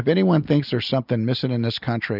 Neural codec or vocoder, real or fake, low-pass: none; real; 5.4 kHz